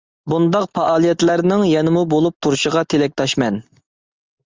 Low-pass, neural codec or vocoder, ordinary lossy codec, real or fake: 7.2 kHz; none; Opus, 32 kbps; real